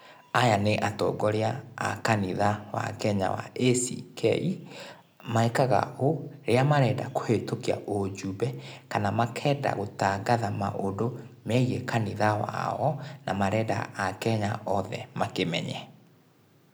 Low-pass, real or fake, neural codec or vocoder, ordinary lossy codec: none; real; none; none